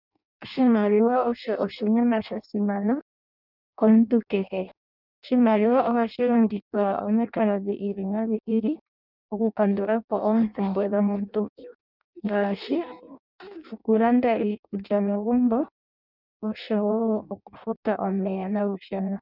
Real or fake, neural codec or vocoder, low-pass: fake; codec, 16 kHz in and 24 kHz out, 0.6 kbps, FireRedTTS-2 codec; 5.4 kHz